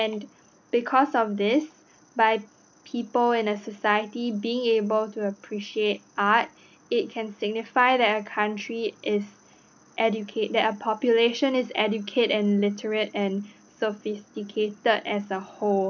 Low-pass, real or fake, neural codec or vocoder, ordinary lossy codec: 7.2 kHz; real; none; none